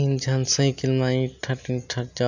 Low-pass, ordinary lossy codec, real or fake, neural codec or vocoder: 7.2 kHz; none; real; none